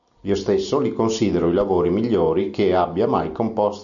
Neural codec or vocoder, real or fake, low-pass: none; real; 7.2 kHz